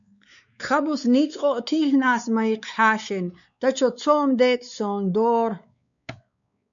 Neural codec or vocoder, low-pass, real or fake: codec, 16 kHz, 4 kbps, X-Codec, WavLM features, trained on Multilingual LibriSpeech; 7.2 kHz; fake